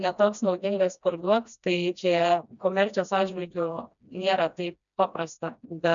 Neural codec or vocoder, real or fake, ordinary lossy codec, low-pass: codec, 16 kHz, 1 kbps, FreqCodec, smaller model; fake; MP3, 96 kbps; 7.2 kHz